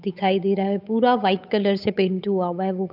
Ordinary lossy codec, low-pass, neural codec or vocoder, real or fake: none; 5.4 kHz; codec, 16 kHz, 8 kbps, FunCodec, trained on Chinese and English, 25 frames a second; fake